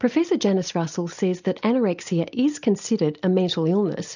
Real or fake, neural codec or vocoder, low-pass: real; none; 7.2 kHz